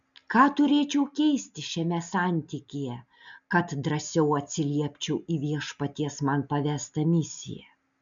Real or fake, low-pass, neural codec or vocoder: real; 7.2 kHz; none